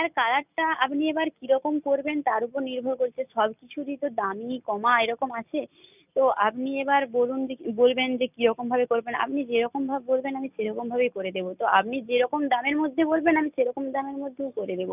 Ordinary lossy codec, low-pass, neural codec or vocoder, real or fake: none; 3.6 kHz; none; real